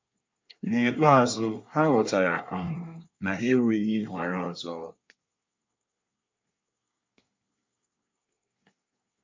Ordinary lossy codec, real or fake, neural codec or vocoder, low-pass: MP3, 64 kbps; fake; codec, 24 kHz, 1 kbps, SNAC; 7.2 kHz